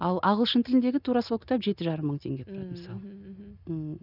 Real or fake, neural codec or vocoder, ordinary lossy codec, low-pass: real; none; none; 5.4 kHz